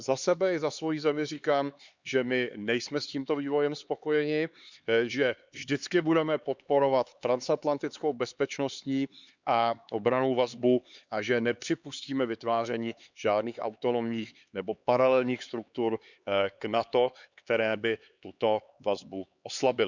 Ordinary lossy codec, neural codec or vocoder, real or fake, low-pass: Opus, 64 kbps; codec, 16 kHz, 4 kbps, X-Codec, HuBERT features, trained on LibriSpeech; fake; 7.2 kHz